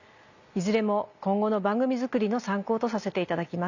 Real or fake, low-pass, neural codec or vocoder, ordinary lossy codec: real; 7.2 kHz; none; none